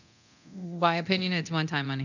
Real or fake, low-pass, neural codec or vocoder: fake; 7.2 kHz; codec, 24 kHz, 0.9 kbps, DualCodec